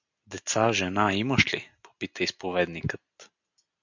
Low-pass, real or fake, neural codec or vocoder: 7.2 kHz; real; none